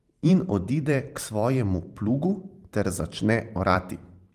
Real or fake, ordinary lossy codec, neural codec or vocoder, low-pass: fake; Opus, 24 kbps; vocoder, 44.1 kHz, 128 mel bands every 512 samples, BigVGAN v2; 14.4 kHz